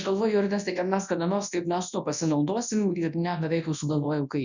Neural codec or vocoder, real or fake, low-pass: codec, 24 kHz, 0.9 kbps, WavTokenizer, large speech release; fake; 7.2 kHz